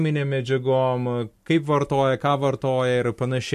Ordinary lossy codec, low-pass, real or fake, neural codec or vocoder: MP3, 64 kbps; 14.4 kHz; real; none